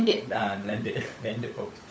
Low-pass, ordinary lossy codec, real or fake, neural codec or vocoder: none; none; fake; codec, 16 kHz, 16 kbps, FunCodec, trained on LibriTTS, 50 frames a second